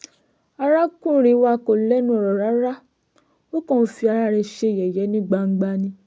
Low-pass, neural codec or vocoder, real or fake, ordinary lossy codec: none; none; real; none